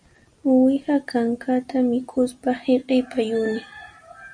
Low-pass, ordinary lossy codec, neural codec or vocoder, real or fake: 9.9 kHz; MP3, 96 kbps; none; real